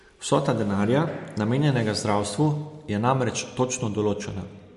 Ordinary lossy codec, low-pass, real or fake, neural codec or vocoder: MP3, 48 kbps; 14.4 kHz; real; none